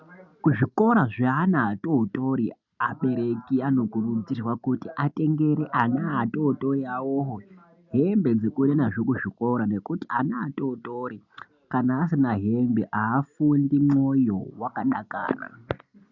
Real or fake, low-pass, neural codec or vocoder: real; 7.2 kHz; none